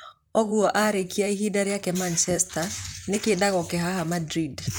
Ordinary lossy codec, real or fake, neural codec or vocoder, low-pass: none; fake; vocoder, 44.1 kHz, 128 mel bands, Pupu-Vocoder; none